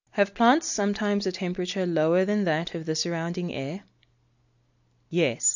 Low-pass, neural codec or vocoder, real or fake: 7.2 kHz; none; real